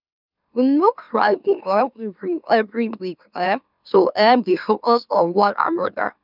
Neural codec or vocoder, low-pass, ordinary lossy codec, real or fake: autoencoder, 44.1 kHz, a latent of 192 numbers a frame, MeloTTS; 5.4 kHz; none; fake